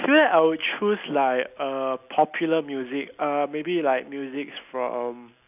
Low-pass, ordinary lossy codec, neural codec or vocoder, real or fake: 3.6 kHz; none; none; real